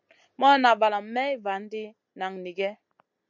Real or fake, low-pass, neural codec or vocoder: real; 7.2 kHz; none